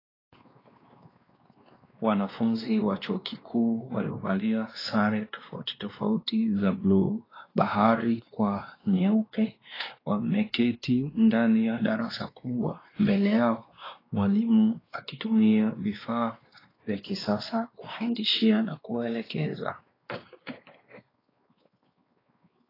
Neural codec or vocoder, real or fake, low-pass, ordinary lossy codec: codec, 16 kHz, 2 kbps, X-Codec, WavLM features, trained on Multilingual LibriSpeech; fake; 5.4 kHz; AAC, 24 kbps